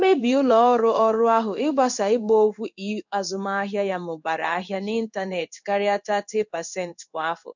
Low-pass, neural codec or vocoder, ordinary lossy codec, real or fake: 7.2 kHz; codec, 16 kHz in and 24 kHz out, 1 kbps, XY-Tokenizer; none; fake